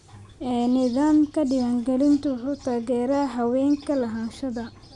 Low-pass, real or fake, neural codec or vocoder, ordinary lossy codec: 10.8 kHz; real; none; none